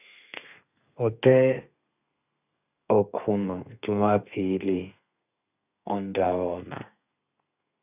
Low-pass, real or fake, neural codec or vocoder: 3.6 kHz; fake; codec, 32 kHz, 1.9 kbps, SNAC